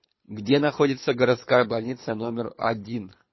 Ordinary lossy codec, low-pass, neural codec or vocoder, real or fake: MP3, 24 kbps; 7.2 kHz; codec, 24 kHz, 3 kbps, HILCodec; fake